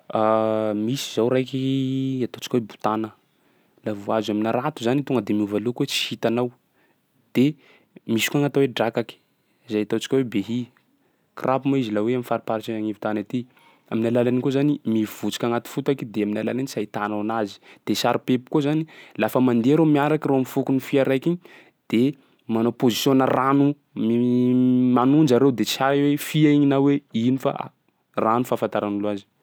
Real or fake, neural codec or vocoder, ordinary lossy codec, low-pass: real; none; none; none